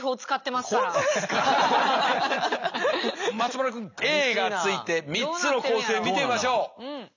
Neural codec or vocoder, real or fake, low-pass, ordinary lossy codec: none; real; 7.2 kHz; none